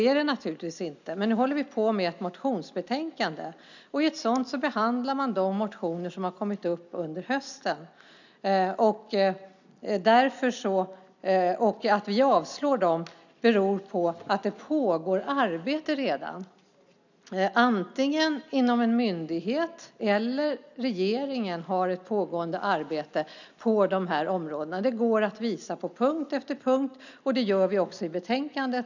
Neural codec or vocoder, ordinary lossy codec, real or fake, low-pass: none; none; real; 7.2 kHz